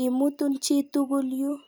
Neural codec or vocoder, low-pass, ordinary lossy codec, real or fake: none; none; none; real